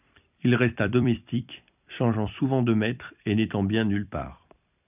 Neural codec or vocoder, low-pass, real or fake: vocoder, 44.1 kHz, 128 mel bands every 256 samples, BigVGAN v2; 3.6 kHz; fake